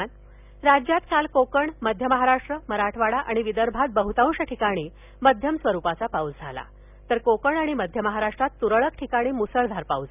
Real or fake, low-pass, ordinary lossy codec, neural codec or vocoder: real; 3.6 kHz; none; none